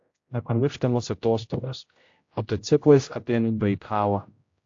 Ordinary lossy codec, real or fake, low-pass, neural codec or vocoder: AAC, 48 kbps; fake; 7.2 kHz; codec, 16 kHz, 0.5 kbps, X-Codec, HuBERT features, trained on general audio